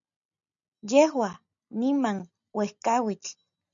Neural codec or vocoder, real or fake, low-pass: none; real; 7.2 kHz